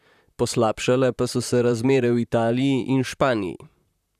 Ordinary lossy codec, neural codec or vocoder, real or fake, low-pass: none; vocoder, 44.1 kHz, 128 mel bands, Pupu-Vocoder; fake; 14.4 kHz